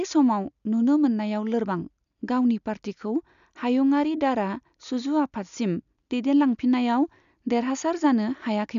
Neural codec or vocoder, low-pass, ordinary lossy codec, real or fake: none; 7.2 kHz; none; real